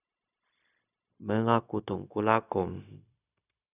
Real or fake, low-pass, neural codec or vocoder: fake; 3.6 kHz; codec, 16 kHz, 0.4 kbps, LongCat-Audio-Codec